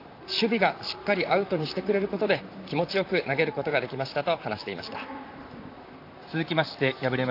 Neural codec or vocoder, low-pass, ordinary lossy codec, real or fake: vocoder, 44.1 kHz, 128 mel bands, Pupu-Vocoder; 5.4 kHz; AAC, 32 kbps; fake